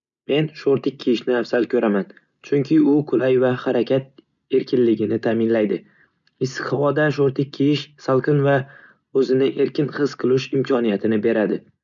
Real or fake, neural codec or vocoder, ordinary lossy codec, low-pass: real; none; none; 7.2 kHz